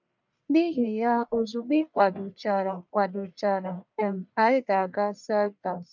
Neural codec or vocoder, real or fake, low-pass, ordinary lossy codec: codec, 44.1 kHz, 1.7 kbps, Pupu-Codec; fake; 7.2 kHz; none